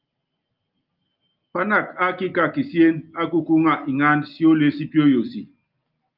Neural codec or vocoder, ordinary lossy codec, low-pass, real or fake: none; Opus, 24 kbps; 5.4 kHz; real